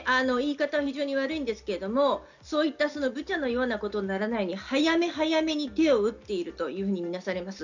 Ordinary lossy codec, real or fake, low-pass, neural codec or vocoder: none; real; 7.2 kHz; none